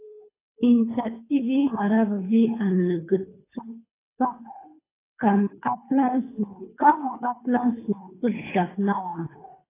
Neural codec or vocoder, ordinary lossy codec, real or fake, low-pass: codec, 24 kHz, 3 kbps, HILCodec; AAC, 16 kbps; fake; 3.6 kHz